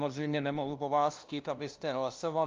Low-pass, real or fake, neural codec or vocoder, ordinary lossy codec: 7.2 kHz; fake; codec, 16 kHz, 0.5 kbps, FunCodec, trained on LibriTTS, 25 frames a second; Opus, 24 kbps